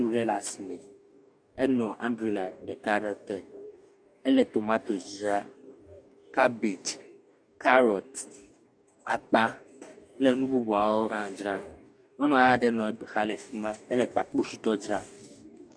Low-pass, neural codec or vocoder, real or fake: 9.9 kHz; codec, 44.1 kHz, 2.6 kbps, DAC; fake